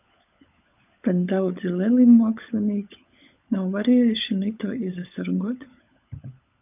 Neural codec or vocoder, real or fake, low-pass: codec, 16 kHz, 16 kbps, FunCodec, trained on LibriTTS, 50 frames a second; fake; 3.6 kHz